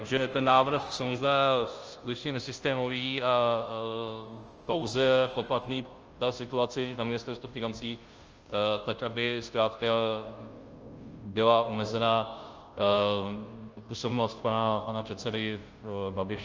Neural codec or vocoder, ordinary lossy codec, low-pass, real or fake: codec, 16 kHz, 0.5 kbps, FunCodec, trained on Chinese and English, 25 frames a second; Opus, 24 kbps; 7.2 kHz; fake